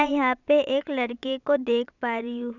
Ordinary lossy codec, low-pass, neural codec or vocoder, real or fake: none; 7.2 kHz; vocoder, 22.05 kHz, 80 mel bands, Vocos; fake